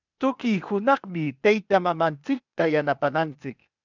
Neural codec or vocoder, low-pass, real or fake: codec, 16 kHz, 0.8 kbps, ZipCodec; 7.2 kHz; fake